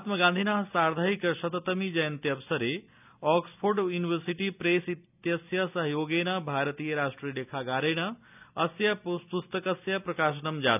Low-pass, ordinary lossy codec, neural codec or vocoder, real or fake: 3.6 kHz; none; none; real